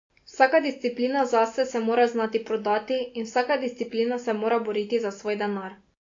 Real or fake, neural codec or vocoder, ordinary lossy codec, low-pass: real; none; AAC, 48 kbps; 7.2 kHz